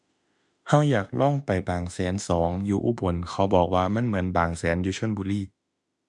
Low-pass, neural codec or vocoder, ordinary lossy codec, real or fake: 10.8 kHz; autoencoder, 48 kHz, 32 numbers a frame, DAC-VAE, trained on Japanese speech; none; fake